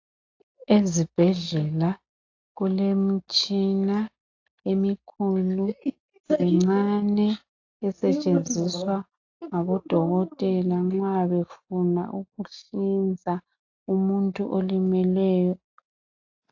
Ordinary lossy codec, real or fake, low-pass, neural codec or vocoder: AAC, 48 kbps; real; 7.2 kHz; none